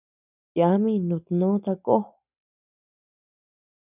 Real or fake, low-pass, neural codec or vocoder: real; 3.6 kHz; none